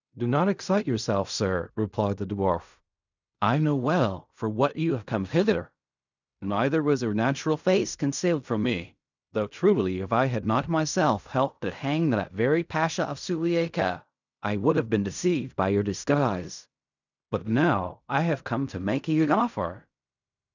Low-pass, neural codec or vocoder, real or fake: 7.2 kHz; codec, 16 kHz in and 24 kHz out, 0.4 kbps, LongCat-Audio-Codec, fine tuned four codebook decoder; fake